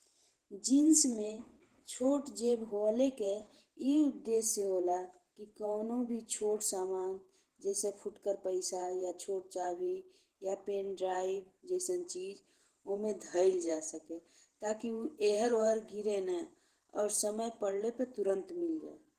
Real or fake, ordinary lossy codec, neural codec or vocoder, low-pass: fake; Opus, 16 kbps; vocoder, 48 kHz, 128 mel bands, Vocos; 14.4 kHz